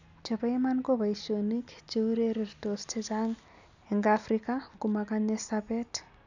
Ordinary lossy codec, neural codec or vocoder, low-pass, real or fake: none; none; 7.2 kHz; real